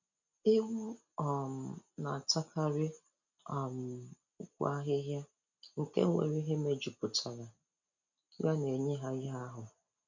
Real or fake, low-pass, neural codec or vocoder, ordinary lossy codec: real; 7.2 kHz; none; none